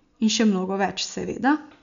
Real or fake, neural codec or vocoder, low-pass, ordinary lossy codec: real; none; 7.2 kHz; MP3, 96 kbps